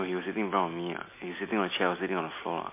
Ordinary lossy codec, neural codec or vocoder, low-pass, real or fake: MP3, 24 kbps; none; 3.6 kHz; real